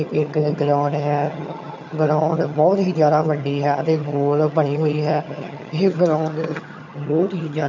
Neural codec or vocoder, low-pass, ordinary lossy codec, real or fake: vocoder, 22.05 kHz, 80 mel bands, HiFi-GAN; 7.2 kHz; MP3, 48 kbps; fake